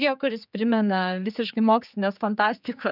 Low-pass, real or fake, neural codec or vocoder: 5.4 kHz; fake; codec, 16 kHz, 4 kbps, X-Codec, HuBERT features, trained on general audio